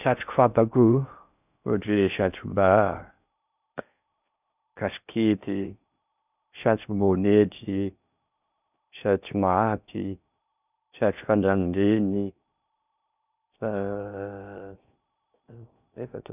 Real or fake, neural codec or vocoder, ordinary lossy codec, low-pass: fake; codec, 16 kHz in and 24 kHz out, 0.6 kbps, FocalCodec, streaming, 2048 codes; none; 3.6 kHz